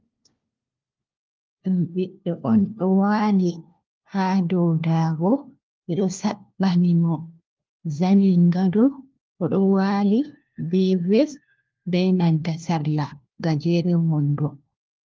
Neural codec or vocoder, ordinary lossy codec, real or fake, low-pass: codec, 16 kHz, 1 kbps, FunCodec, trained on LibriTTS, 50 frames a second; Opus, 32 kbps; fake; 7.2 kHz